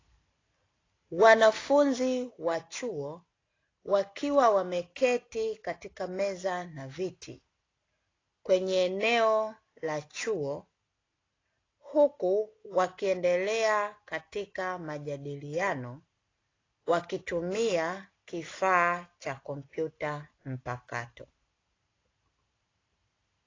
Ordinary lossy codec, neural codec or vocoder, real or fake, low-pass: AAC, 32 kbps; none; real; 7.2 kHz